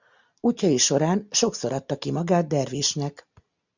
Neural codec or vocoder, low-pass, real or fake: none; 7.2 kHz; real